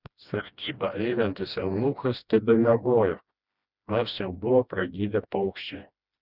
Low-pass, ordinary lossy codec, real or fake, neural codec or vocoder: 5.4 kHz; Opus, 64 kbps; fake; codec, 16 kHz, 1 kbps, FreqCodec, smaller model